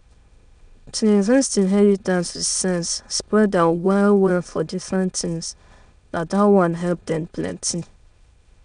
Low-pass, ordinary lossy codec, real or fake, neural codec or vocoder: 9.9 kHz; none; fake; autoencoder, 22.05 kHz, a latent of 192 numbers a frame, VITS, trained on many speakers